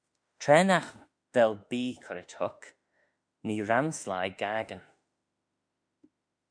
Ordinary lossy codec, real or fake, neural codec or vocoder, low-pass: MP3, 64 kbps; fake; autoencoder, 48 kHz, 32 numbers a frame, DAC-VAE, trained on Japanese speech; 9.9 kHz